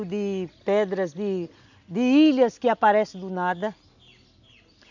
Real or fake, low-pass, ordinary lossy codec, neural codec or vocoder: real; 7.2 kHz; none; none